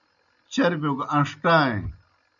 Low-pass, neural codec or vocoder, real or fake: 7.2 kHz; none; real